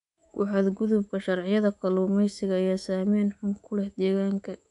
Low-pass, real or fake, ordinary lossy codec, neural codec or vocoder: 10.8 kHz; fake; none; codec, 24 kHz, 3.1 kbps, DualCodec